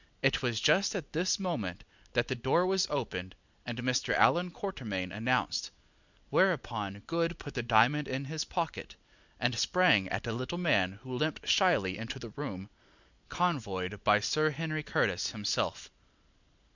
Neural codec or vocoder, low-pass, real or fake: none; 7.2 kHz; real